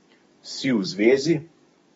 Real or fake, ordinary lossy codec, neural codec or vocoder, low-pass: fake; AAC, 24 kbps; codec, 44.1 kHz, 7.8 kbps, DAC; 19.8 kHz